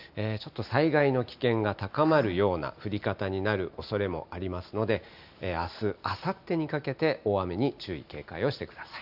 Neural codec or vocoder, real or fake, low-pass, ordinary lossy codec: none; real; 5.4 kHz; none